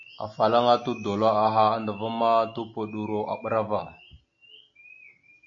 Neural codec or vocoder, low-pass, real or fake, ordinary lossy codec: none; 7.2 kHz; real; AAC, 48 kbps